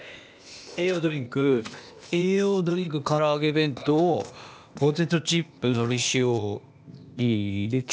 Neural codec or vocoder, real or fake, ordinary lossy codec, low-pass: codec, 16 kHz, 0.8 kbps, ZipCodec; fake; none; none